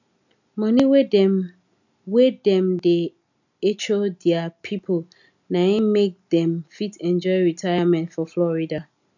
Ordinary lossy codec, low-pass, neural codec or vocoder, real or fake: none; 7.2 kHz; none; real